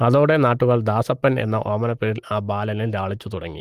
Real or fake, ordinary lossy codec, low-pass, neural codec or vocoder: real; Opus, 24 kbps; 14.4 kHz; none